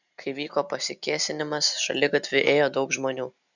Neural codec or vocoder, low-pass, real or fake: none; 7.2 kHz; real